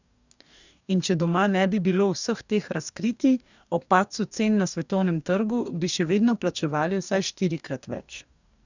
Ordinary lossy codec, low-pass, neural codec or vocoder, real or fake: none; 7.2 kHz; codec, 44.1 kHz, 2.6 kbps, DAC; fake